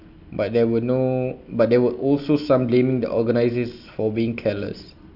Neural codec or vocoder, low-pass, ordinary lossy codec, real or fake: none; 5.4 kHz; none; real